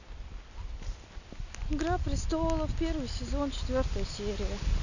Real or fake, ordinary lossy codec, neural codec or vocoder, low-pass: real; none; none; 7.2 kHz